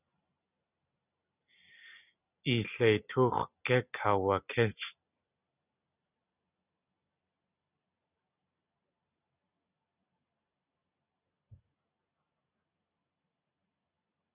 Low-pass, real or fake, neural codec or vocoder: 3.6 kHz; real; none